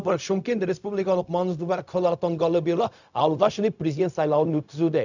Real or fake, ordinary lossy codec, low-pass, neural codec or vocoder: fake; none; 7.2 kHz; codec, 16 kHz, 0.4 kbps, LongCat-Audio-Codec